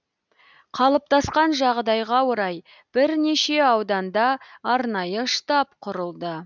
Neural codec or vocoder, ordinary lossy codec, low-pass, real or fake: none; none; 7.2 kHz; real